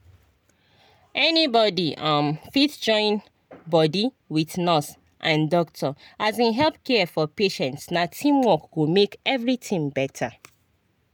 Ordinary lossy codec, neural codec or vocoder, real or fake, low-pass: none; none; real; none